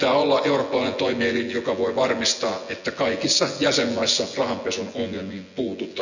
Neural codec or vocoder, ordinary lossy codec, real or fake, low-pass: vocoder, 24 kHz, 100 mel bands, Vocos; none; fake; 7.2 kHz